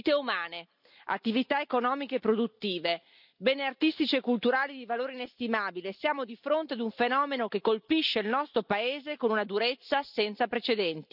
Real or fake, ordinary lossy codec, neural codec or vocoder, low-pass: real; none; none; 5.4 kHz